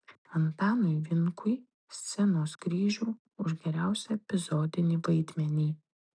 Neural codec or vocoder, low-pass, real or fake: none; 9.9 kHz; real